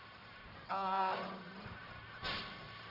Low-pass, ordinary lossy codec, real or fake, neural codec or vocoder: 5.4 kHz; none; fake; codec, 44.1 kHz, 1.7 kbps, Pupu-Codec